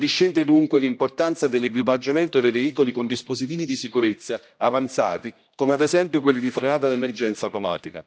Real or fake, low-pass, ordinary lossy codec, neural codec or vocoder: fake; none; none; codec, 16 kHz, 1 kbps, X-Codec, HuBERT features, trained on general audio